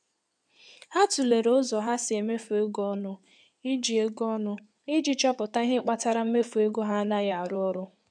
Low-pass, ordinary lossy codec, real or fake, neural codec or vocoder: 9.9 kHz; none; fake; codec, 16 kHz in and 24 kHz out, 2.2 kbps, FireRedTTS-2 codec